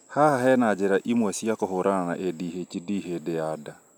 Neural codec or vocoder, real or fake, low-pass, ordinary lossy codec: none; real; none; none